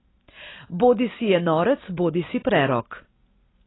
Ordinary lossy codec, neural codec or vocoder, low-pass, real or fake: AAC, 16 kbps; none; 7.2 kHz; real